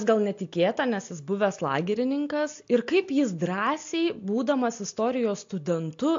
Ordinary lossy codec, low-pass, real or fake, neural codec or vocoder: MP3, 48 kbps; 7.2 kHz; real; none